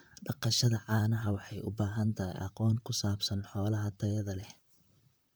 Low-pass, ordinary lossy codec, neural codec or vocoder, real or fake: none; none; vocoder, 44.1 kHz, 128 mel bands, Pupu-Vocoder; fake